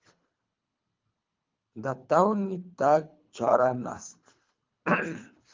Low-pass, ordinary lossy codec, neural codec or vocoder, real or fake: 7.2 kHz; Opus, 24 kbps; codec, 24 kHz, 3 kbps, HILCodec; fake